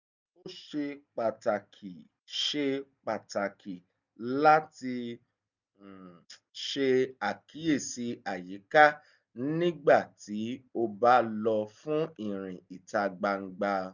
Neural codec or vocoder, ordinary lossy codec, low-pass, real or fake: none; none; 7.2 kHz; real